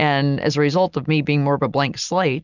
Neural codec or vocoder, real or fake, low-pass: none; real; 7.2 kHz